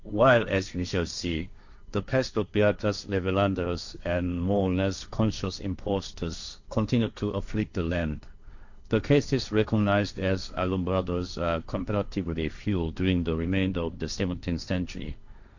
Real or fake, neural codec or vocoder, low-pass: fake; codec, 16 kHz, 1.1 kbps, Voila-Tokenizer; 7.2 kHz